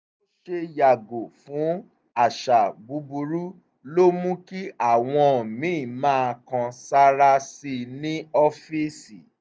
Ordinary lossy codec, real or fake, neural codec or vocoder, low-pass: none; real; none; none